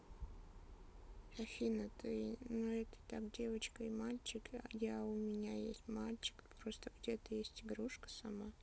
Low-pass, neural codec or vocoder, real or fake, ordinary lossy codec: none; none; real; none